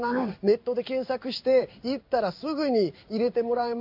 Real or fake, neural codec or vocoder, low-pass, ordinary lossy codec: fake; codec, 16 kHz in and 24 kHz out, 1 kbps, XY-Tokenizer; 5.4 kHz; MP3, 48 kbps